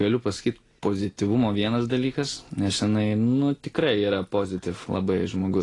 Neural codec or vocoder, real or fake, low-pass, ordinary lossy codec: none; real; 10.8 kHz; AAC, 32 kbps